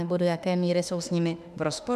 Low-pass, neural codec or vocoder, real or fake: 14.4 kHz; autoencoder, 48 kHz, 32 numbers a frame, DAC-VAE, trained on Japanese speech; fake